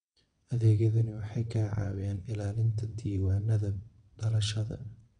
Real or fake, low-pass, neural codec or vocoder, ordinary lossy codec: fake; 9.9 kHz; vocoder, 22.05 kHz, 80 mel bands, WaveNeXt; none